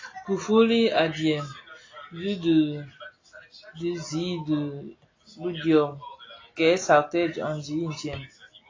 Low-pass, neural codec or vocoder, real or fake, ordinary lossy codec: 7.2 kHz; none; real; AAC, 32 kbps